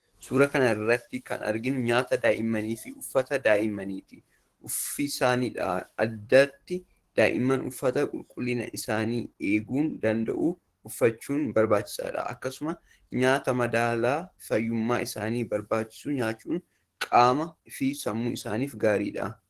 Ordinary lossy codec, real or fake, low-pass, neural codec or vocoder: Opus, 16 kbps; fake; 14.4 kHz; codec, 44.1 kHz, 7.8 kbps, DAC